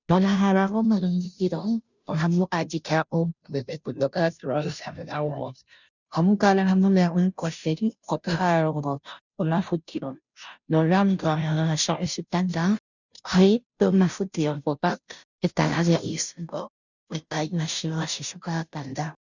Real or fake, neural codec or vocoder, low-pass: fake; codec, 16 kHz, 0.5 kbps, FunCodec, trained on Chinese and English, 25 frames a second; 7.2 kHz